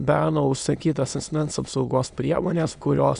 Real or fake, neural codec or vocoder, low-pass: fake; autoencoder, 22.05 kHz, a latent of 192 numbers a frame, VITS, trained on many speakers; 9.9 kHz